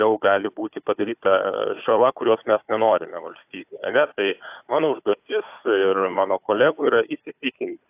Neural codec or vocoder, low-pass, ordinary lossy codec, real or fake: codec, 16 kHz, 4 kbps, FunCodec, trained on Chinese and English, 50 frames a second; 3.6 kHz; AAC, 32 kbps; fake